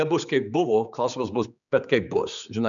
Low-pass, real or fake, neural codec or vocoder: 7.2 kHz; fake; codec, 16 kHz, 4 kbps, X-Codec, HuBERT features, trained on general audio